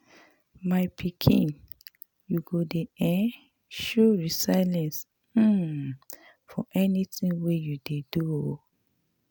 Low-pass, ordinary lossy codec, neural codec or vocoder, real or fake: none; none; none; real